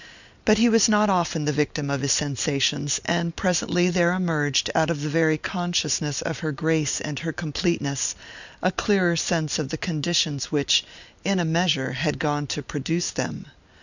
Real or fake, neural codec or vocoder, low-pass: real; none; 7.2 kHz